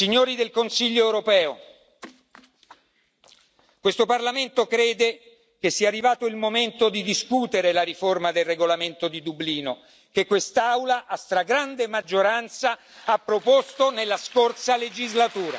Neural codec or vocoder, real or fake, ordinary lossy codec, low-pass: none; real; none; none